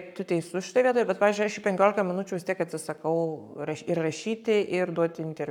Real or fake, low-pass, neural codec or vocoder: fake; 19.8 kHz; codec, 44.1 kHz, 7.8 kbps, Pupu-Codec